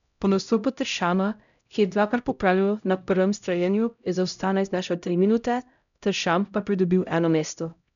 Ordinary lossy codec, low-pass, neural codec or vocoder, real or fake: none; 7.2 kHz; codec, 16 kHz, 0.5 kbps, X-Codec, HuBERT features, trained on LibriSpeech; fake